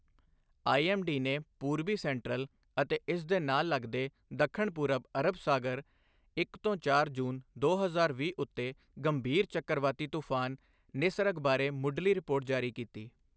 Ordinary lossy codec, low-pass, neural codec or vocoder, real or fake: none; none; none; real